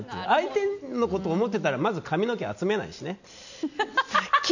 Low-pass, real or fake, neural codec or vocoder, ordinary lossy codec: 7.2 kHz; real; none; none